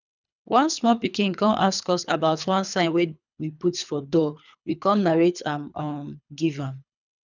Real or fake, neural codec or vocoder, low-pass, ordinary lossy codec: fake; codec, 24 kHz, 3 kbps, HILCodec; 7.2 kHz; none